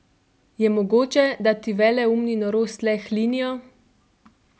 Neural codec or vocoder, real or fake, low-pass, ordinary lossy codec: none; real; none; none